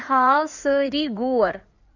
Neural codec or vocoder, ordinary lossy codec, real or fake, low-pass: codec, 16 kHz in and 24 kHz out, 2.2 kbps, FireRedTTS-2 codec; none; fake; 7.2 kHz